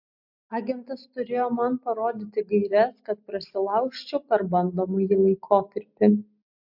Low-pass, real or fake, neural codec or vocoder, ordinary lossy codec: 5.4 kHz; real; none; MP3, 48 kbps